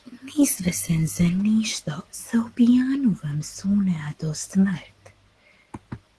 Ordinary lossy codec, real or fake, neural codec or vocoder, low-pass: Opus, 16 kbps; fake; autoencoder, 48 kHz, 128 numbers a frame, DAC-VAE, trained on Japanese speech; 10.8 kHz